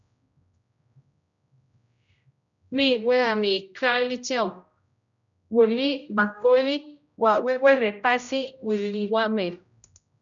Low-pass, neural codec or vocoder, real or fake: 7.2 kHz; codec, 16 kHz, 0.5 kbps, X-Codec, HuBERT features, trained on general audio; fake